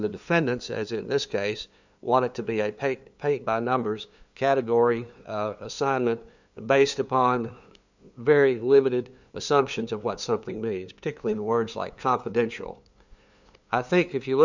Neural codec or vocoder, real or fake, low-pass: codec, 16 kHz, 2 kbps, FunCodec, trained on LibriTTS, 25 frames a second; fake; 7.2 kHz